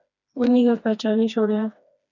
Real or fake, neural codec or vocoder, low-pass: fake; codec, 16 kHz, 2 kbps, FreqCodec, smaller model; 7.2 kHz